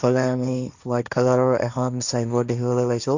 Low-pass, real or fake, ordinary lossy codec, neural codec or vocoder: 7.2 kHz; fake; none; codec, 16 kHz, 1.1 kbps, Voila-Tokenizer